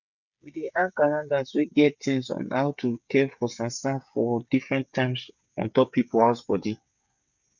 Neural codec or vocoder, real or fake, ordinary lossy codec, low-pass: codec, 16 kHz, 8 kbps, FreqCodec, smaller model; fake; none; 7.2 kHz